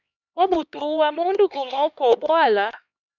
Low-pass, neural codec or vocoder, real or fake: 7.2 kHz; codec, 16 kHz, 2 kbps, X-Codec, HuBERT features, trained on LibriSpeech; fake